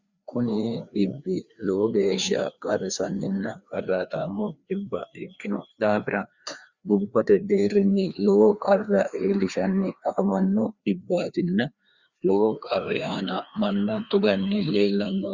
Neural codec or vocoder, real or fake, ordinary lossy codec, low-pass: codec, 16 kHz, 2 kbps, FreqCodec, larger model; fake; Opus, 64 kbps; 7.2 kHz